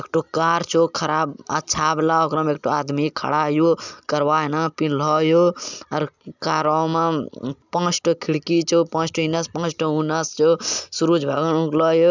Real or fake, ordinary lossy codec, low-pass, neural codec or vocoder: real; none; 7.2 kHz; none